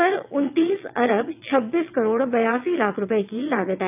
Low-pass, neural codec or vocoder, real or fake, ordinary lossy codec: 3.6 kHz; vocoder, 22.05 kHz, 80 mel bands, WaveNeXt; fake; AAC, 32 kbps